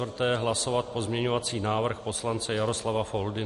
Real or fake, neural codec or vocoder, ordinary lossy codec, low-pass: real; none; MP3, 48 kbps; 14.4 kHz